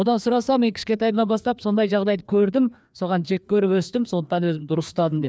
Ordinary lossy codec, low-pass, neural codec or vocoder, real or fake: none; none; codec, 16 kHz, 2 kbps, FreqCodec, larger model; fake